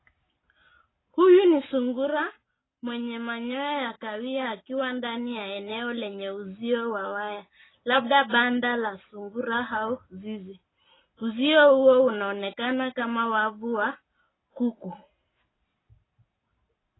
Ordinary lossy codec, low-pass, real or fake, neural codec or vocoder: AAC, 16 kbps; 7.2 kHz; real; none